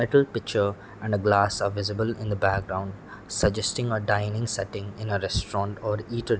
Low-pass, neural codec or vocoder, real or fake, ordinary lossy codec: none; none; real; none